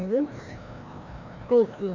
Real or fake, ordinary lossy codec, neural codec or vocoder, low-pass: fake; none; codec, 16 kHz, 1 kbps, FreqCodec, larger model; 7.2 kHz